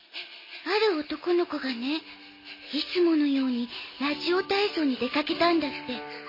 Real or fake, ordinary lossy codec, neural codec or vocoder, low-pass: real; MP3, 24 kbps; none; 5.4 kHz